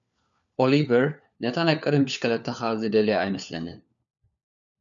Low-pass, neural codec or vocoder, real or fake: 7.2 kHz; codec, 16 kHz, 4 kbps, FunCodec, trained on LibriTTS, 50 frames a second; fake